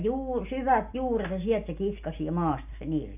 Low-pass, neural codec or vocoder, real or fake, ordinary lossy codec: 3.6 kHz; none; real; AAC, 32 kbps